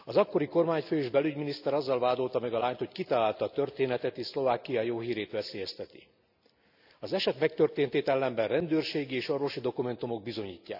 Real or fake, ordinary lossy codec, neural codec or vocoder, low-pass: real; none; none; 5.4 kHz